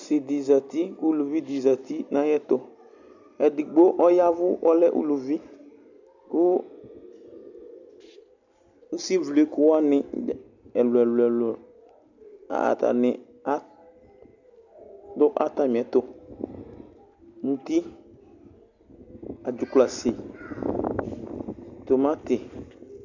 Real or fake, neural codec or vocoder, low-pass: real; none; 7.2 kHz